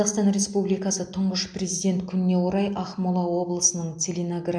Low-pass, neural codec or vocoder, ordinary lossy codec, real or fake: 9.9 kHz; none; MP3, 64 kbps; real